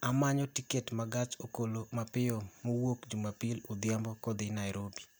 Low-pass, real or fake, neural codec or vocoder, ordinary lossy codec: none; real; none; none